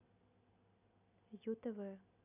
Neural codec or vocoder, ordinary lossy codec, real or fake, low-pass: none; none; real; 3.6 kHz